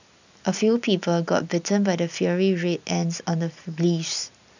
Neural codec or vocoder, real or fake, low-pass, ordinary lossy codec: none; real; 7.2 kHz; none